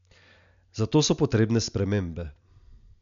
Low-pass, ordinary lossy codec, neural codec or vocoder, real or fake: 7.2 kHz; none; none; real